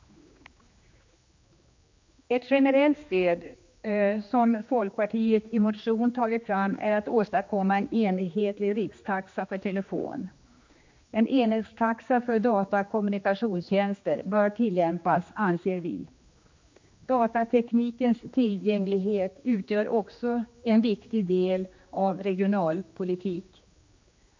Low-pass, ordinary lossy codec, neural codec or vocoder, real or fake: 7.2 kHz; MP3, 48 kbps; codec, 16 kHz, 2 kbps, X-Codec, HuBERT features, trained on general audio; fake